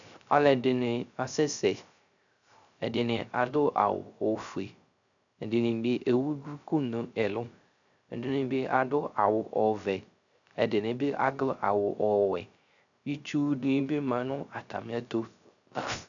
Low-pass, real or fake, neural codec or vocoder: 7.2 kHz; fake; codec, 16 kHz, 0.3 kbps, FocalCodec